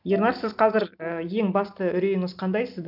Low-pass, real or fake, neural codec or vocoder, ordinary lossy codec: 5.4 kHz; real; none; none